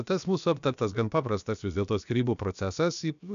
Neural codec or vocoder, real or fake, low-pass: codec, 16 kHz, about 1 kbps, DyCAST, with the encoder's durations; fake; 7.2 kHz